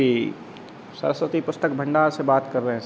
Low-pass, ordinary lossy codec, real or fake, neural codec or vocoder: none; none; real; none